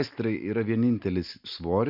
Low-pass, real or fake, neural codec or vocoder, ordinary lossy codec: 5.4 kHz; real; none; MP3, 48 kbps